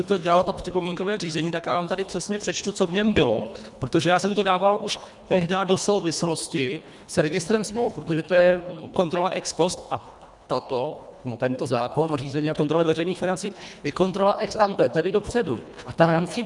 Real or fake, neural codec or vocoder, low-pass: fake; codec, 24 kHz, 1.5 kbps, HILCodec; 10.8 kHz